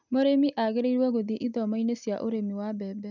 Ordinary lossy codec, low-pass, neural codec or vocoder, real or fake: none; 7.2 kHz; none; real